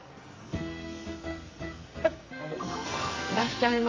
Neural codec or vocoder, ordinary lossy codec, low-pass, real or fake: codec, 44.1 kHz, 2.6 kbps, SNAC; Opus, 32 kbps; 7.2 kHz; fake